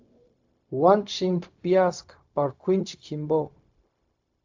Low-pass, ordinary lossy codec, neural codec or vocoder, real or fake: 7.2 kHz; AAC, 48 kbps; codec, 16 kHz, 0.4 kbps, LongCat-Audio-Codec; fake